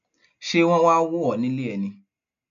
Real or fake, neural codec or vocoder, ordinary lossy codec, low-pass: real; none; none; 7.2 kHz